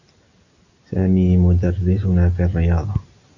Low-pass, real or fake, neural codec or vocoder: 7.2 kHz; real; none